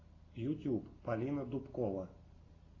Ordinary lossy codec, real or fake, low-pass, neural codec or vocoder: AAC, 32 kbps; real; 7.2 kHz; none